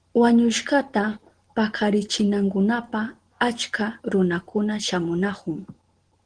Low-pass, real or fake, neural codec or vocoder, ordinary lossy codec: 9.9 kHz; real; none; Opus, 16 kbps